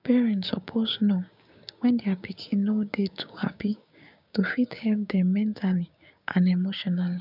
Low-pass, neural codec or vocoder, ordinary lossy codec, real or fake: 5.4 kHz; codec, 16 kHz, 6 kbps, DAC; none; fake